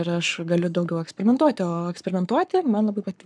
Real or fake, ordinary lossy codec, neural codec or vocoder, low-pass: fake; MP3, 96 kbps; codec, 44.1 kHz, 7.8 kbps, Pupu-Codec; 9.9 kHz